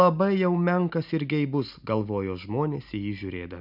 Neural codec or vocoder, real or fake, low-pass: none; real; 5.4 kHz